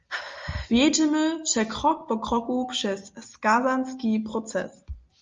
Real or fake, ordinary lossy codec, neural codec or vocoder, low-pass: real; Opus, 32 kbps; none; 7.2 kHz